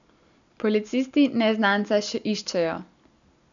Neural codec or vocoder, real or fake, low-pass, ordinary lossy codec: none; real; 7.2 kHz; none